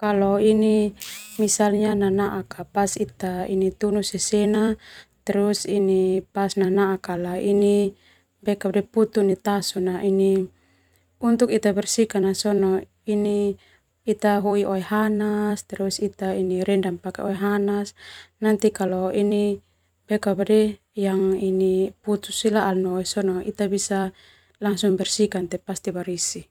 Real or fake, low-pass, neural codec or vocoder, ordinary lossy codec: fake; 19.8 kHz; vocoder, 44.1 kHz, 128 mel bands every 256 samples, BigVGAN v2; none